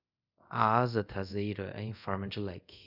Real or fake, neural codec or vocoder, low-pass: fake; codec, 24 kHz, 0.5 kbps, DualCodec; 5.4 kHz